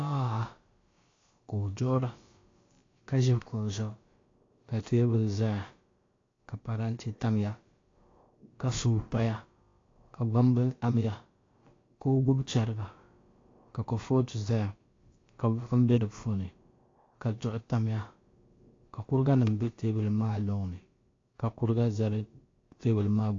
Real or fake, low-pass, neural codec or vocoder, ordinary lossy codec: fake; 7.2 kHz; codec, 16 kHz, about 1 kbps, DyCAST, with the encoder's durations; AAC, 32 kbps